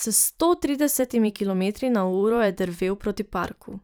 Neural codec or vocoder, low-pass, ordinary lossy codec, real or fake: vocoder, 44.1 kHz, 128 mel bands every 512 samples, BigVGAN v2; none; none; fake